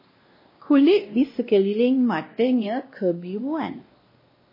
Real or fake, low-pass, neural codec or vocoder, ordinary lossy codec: fake; 5.4 kHz; codec, 16 kHz, 1 kbps, X-Codec, WavLM features, trained on Multilingual LibriSpeech; MP3, 24 kbps